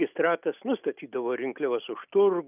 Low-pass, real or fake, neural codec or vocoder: 3.6 kHz; real; none